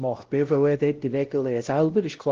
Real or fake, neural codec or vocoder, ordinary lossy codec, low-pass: fake; codec, 16 kHz, 0.5 kbps, X-Codec, WavLM features, trained on Multilingual LibriSpeech; Opus, 16 kbps; 7.2 kHz